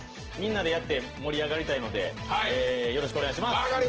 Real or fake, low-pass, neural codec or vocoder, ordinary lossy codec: real; 7.2 kHz; none; Opus, 16 kbps